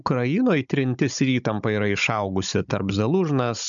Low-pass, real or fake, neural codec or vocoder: 7.2 kHz; fake; codec, 16 kHz, 16 kbps, FunCodec, trained on Chinese and English, 50 frames a second